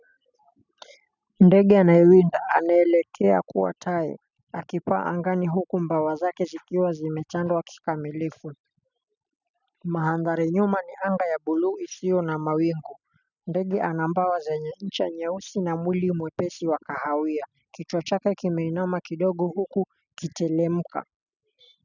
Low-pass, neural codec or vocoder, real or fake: 7.2 kHz; none; real